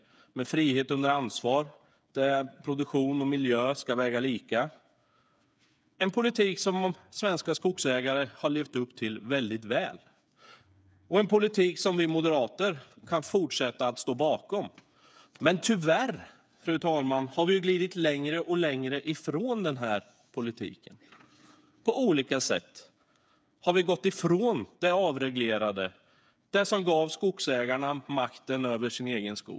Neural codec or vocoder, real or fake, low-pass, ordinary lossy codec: codec, 16 kHz, 8 kbps, FreqCodec, smaller model; fake; none; none